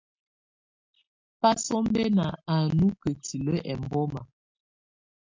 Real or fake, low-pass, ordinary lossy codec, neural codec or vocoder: real; 7.2 kHz; MP3, 64 kbps; none